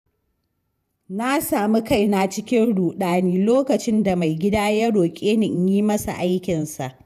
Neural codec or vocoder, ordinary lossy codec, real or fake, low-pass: vocoder, 48 kHz, 128 mel bands, Vocos; none; fake; 14.4 kHz